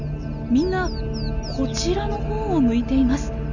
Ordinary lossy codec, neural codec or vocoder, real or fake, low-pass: none; none; real; 7.2 kHz